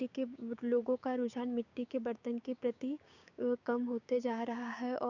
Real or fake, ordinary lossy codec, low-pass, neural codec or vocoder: fake; none; 7.2 kHz; codec, 16 kHz, 6 kbps, DAC